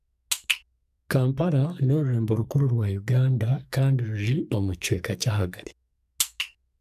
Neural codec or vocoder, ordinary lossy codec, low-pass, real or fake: codec, 44.1 kHz, 2.6 kbps, SNAC; none; 14.4 kHz; fake